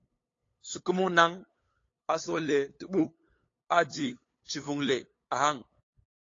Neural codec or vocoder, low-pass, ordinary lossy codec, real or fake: codec, 16 kHz, 8 kbps, FunCodec, trained on LibriTTS, 25 frames a second; 7.2 kHz; AAC, 32 kbps; fake